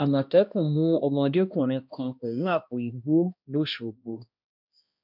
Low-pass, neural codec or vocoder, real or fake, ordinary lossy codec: 5.4 kHz; codec, 16 kHz, 1 kbps, X-Codec, HuBERT features, trained on balanced general audio; fake; MP3, 48 kbps